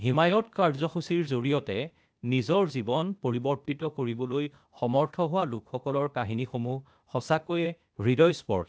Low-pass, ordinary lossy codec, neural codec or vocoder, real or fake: none; none; codec, 16 kHz, 0.8 kbps, ZipCodec; fake